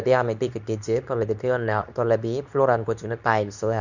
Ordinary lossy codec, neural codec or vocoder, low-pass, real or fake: none; codec, 24 kHz, 0.9 kbps, WavTokenizer, medium speech release version 2; 7.2 kHz; fake